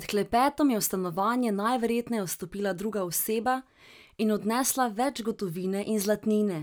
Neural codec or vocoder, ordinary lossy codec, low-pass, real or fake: none; none; none; real